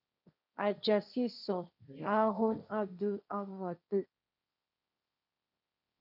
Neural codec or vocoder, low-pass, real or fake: codec, 16 kHz, 1.1 kbps, Voila-Tokenizer; 5.4 kHz; fake